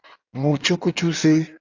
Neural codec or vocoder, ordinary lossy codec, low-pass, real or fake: codec, 16 kHz in and 24 kHz out, 1.1 kbps, FireRedTTS-2 codec; AAC, 48 kbps; 7.2 kHz; fake